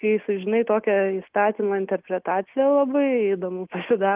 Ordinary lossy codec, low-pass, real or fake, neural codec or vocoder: Opus, 32 kbps; 3.6 kHz; real; none